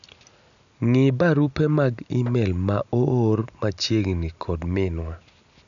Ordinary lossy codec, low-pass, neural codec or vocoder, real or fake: none; 7.2 kHz; none; real